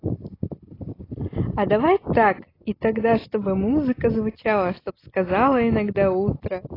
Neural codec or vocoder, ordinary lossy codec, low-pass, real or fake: none; AAC, 24 kbps; 5.4 kHz; real